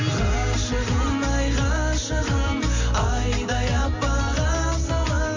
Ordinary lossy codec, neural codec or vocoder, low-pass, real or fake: AAC, 48 kbps; none; 7.2 kHz; real